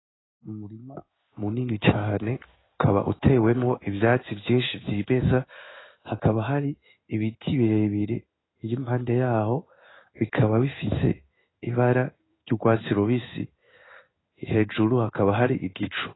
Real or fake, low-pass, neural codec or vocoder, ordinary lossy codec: fake; 7.2 kHz; codec, 16 kHz in and 24 kHz out, 1 kbps, XY-Tokenizer; AAC, 16 kbps